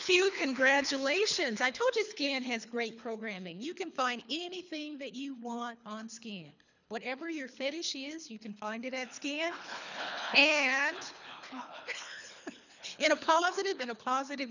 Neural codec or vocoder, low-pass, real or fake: codec, 24 kHz, 3 kbps, HILCodec; 7.2 kHz; fake